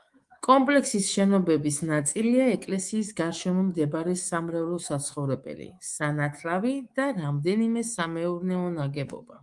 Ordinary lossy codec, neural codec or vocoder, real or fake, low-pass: Opus, 24 kbps; codec, 24 kHz, 3.1 kbps, DualCodec; fake; 10.8 kHz